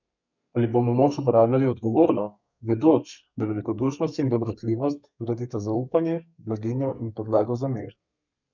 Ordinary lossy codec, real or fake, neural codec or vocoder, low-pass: none; fake; codec, 32 kHz, 1.9 kbps, SNAC; 7.2 kHz